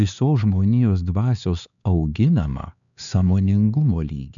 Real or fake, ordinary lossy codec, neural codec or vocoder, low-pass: fake; MP3, 96 kbps; codec, 16 kHz, 4 kbps, X-Codec, HuBERT features, trained on LibriSpeech; 7.2 kHz